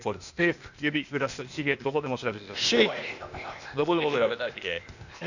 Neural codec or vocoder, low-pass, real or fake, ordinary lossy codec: codec, 16 kHz, 0.8 kbps, ZipCodec; 7.2 kHz; fake; none